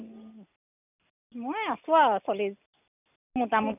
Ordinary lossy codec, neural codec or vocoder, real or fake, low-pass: none; none; real; 3.6 kHz